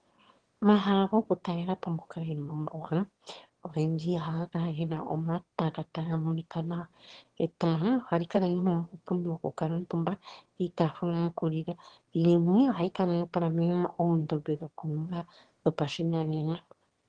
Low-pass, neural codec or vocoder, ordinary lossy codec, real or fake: 9.9 kHz; autoencoder, 22.05 kHz, a latent of 192 numbers a frame, VITS, trained on one speaker; Opus, 16 kbps; fake